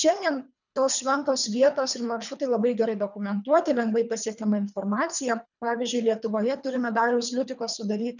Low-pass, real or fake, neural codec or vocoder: 7.2 kHz; fake; codec, 24 kHz, 3 kbps, HILCodec